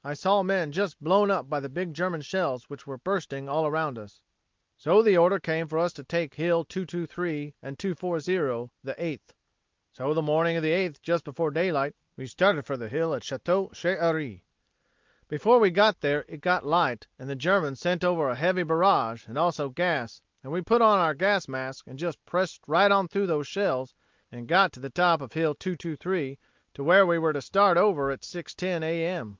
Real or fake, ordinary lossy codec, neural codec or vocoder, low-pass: real; Opus, 24 kbps; none; 7.2 kHz